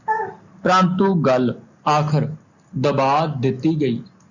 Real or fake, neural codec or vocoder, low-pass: real; none; 7.2 kHz